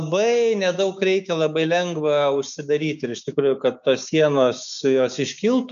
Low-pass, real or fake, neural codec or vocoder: 7.2 kHz; fake; codec, 16 kHz, 6 kbps, DAC